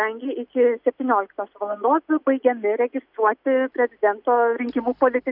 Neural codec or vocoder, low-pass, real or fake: none; 5.4 kHz; real